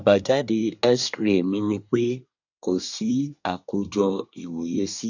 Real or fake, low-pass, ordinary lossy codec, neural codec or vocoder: fake; 7.2 kHz; none; codec, 24 kHz, 1 kbps, SNAC